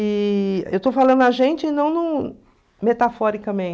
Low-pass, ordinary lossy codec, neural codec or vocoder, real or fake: none; none; none; real